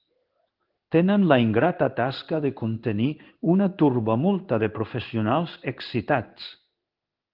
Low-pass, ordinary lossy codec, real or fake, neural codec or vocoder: 5.4 kHz; Opus, 32 kbps; fake; codec, 16 kHz in and 24 kHz out, 1 kbps, XY-Tokenizer